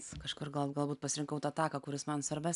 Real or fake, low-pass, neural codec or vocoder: real; 10.8 kHz; none